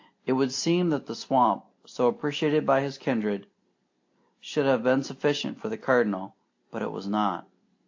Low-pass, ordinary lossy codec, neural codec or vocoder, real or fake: 7.2 kHz; AAC, 48 kbps; none; real